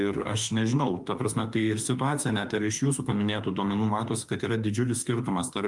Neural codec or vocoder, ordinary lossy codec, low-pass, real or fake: autoencoder, 48 kHz, 32 numbers a frame, DAC-VAE, trained on Japanese speech; Opus, 32 kbps; 10.8 kHz; fake